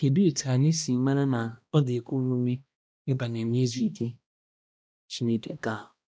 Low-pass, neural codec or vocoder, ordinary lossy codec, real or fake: none; codec, 16 kHz, 1 kbps, X-Codec, HuBERT features, trained on balanced general audio; none; fake